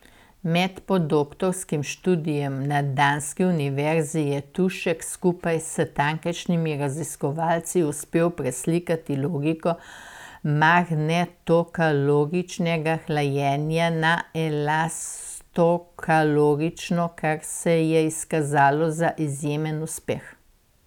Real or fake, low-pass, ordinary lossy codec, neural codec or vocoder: real; 19.8 kHz; none; none